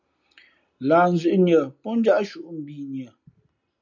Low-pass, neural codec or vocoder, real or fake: 7.2 kHz; none; real